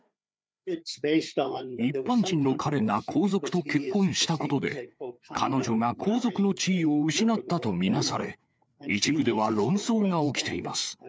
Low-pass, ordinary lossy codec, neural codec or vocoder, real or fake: none; none; codec, 16 kHz, 4 kbps, FreqCodec, larger model; fake